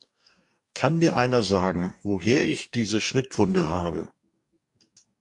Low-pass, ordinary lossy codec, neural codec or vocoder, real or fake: 10.8 kHz; AAC, 64 kbps; codec, 44.1 kHz, 2.6 kbps, DAC; fake